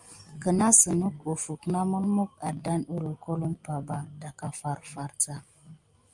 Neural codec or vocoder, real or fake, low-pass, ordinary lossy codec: none; real; 10.8 kHz; Opus, 32 kbps